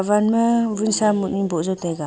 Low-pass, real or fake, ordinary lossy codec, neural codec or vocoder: none; real; none; none